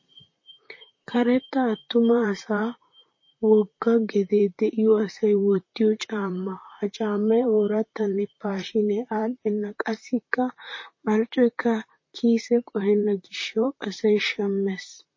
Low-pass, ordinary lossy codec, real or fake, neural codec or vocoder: 7.2 kHz; MP3, 32 kbps; fake; vocoder, 44.1 kHz, 128 mel bands, Pupu-Vocoder